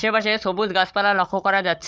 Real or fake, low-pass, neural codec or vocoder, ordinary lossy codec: fake; none; codec, 16 kHz, 16 kbps, FunCodec, trained on Chinese and English, 50 frames a second; none